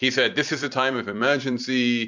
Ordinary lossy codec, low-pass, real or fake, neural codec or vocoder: MP3, 64 kbps; 7.2 kHz; fake; vocoder, 44.1 kHz, 128 mel bands every 256 samples, BigVGAN v2